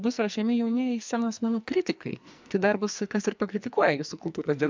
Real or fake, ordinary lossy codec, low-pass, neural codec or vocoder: fake; MP3, 64 kbps; 7.2 kHz; codec, 44.1 kHz, 2.6 kbps, SNAC